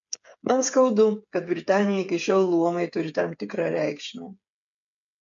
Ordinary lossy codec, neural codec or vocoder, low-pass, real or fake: MP3, 48 kbps; codec, 16 kHz, 8 kbps, FreqCodec, smaller model; 7.2 kHz; fake